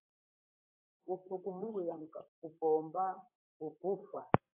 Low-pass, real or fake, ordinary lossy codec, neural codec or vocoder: 3.6 kHz; fake; AAC, 24 kbps; vocoder, 44.1 kHz, 128 mel bands, Pupu-Vocoder